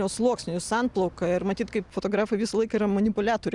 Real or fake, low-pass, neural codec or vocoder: real; 10.8 kHz; none